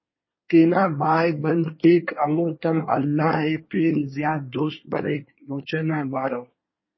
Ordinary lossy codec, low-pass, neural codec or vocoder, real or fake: MP3, 24 kbps; 7.2 kHz; codec, 24 kHz, 1 kbps, SNAC; fake